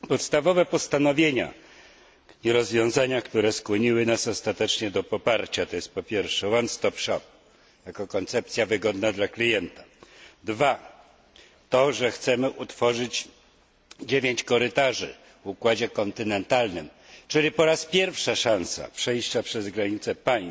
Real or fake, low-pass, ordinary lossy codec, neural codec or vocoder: real; none; none; none